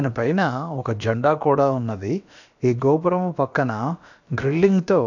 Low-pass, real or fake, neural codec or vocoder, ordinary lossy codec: 7.2 kHz; fake; codec, 16 kHz, about 1 kbps, DyCAST, with the encoder's durations; none